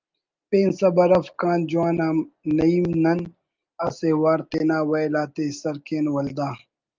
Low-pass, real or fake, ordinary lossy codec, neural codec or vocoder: 7.2 kHz; real; Opus, 24 kbps; none